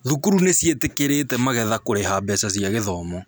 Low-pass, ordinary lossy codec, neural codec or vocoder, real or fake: none; none; none; real